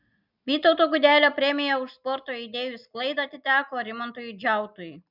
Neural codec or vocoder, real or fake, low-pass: none; real; 5.4 kHz